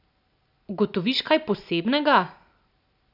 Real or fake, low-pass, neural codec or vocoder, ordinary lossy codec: real; 5.4 kHz; none; none